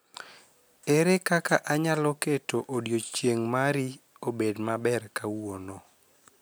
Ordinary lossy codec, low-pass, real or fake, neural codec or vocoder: none; none; real; none